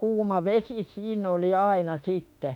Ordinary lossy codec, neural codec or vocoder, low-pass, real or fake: none; autoencoder, 48 kHz, 32 numbers a frame, DAC-VAE, trained on Japanese speech; 19.8 kHz; fake